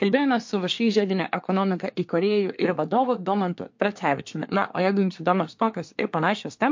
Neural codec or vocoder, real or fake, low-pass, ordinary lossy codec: codec, 24 kHz, 1 kbps, SNAC; fake; 7.2 kHz; MP3, 48 kbps